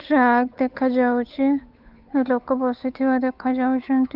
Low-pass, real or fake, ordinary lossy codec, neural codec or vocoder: 5.4 kHz; fake; Opus, 24 kbps; codec, 16 kHz, 8 kbps, FunCodec, trained on Chinese and English, 25 frames a second